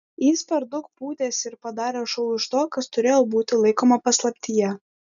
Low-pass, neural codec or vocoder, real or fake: 7.2 kHz; none; real